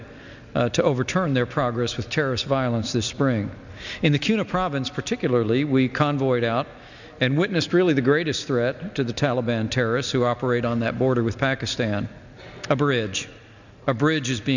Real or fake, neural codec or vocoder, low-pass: real; none; 7.2 kHz